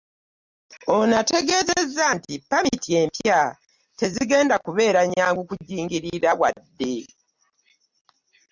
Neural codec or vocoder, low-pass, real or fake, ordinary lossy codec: none; 7.2 kHz; real; Opus, 64 kbps